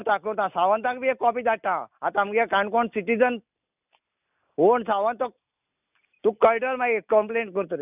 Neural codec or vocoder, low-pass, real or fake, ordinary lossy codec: none; 3.6 kHz; real; none